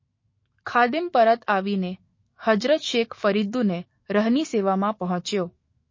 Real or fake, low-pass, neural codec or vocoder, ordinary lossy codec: fake; 7.2 kHz; autoencoder, 48 kHz, 128 numbers a frame, DAC-VAE, trained on Japanese speech; MP3, 32 kbps